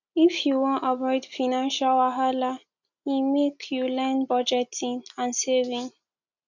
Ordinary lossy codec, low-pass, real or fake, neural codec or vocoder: none; 7.2 kHz; real; none